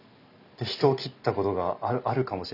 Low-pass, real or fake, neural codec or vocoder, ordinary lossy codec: 5.4 kHz; real; none; none